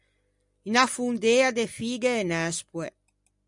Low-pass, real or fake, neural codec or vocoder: 10.8 kHz; real; none